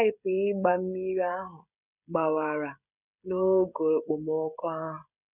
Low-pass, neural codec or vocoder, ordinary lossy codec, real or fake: 3.6 kHz; codec, 16 kHz, 16 kbps, FreqCodec, smaller model; none; fake